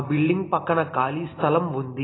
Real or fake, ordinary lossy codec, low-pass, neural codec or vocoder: real; AAC, 16 kbps; 7.2 kHz; none